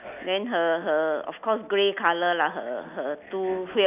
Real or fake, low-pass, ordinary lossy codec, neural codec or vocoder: real; 3.6 kHz; none; none